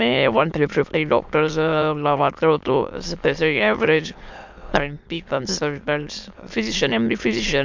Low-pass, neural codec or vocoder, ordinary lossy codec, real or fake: 7.2 kHz; autoencoder, 22.05 kHz, a latent of 192 numbers a frame, VITS, trained on many speakers; MP3, 64 kbps; fake